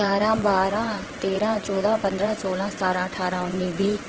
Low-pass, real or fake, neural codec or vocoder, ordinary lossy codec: 7.2 kHz; fake; vocoder, 22.05 kHz, 80 mel bands, WaveNeXt; Opus, 16 kbps